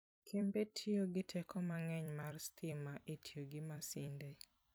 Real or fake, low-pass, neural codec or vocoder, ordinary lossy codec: fake; none; vocoder, 44.1 kHz, 128 mel bands every 256 samples, BigVGAN v2; none